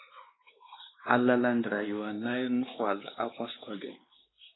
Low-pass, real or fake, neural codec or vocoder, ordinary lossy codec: 7.2 kHz; fake; codec, 16 kHz, 2 kbps, X-Codec, WavLM features, trained on Multilingual LibriSpeech; AAC, 16 kbps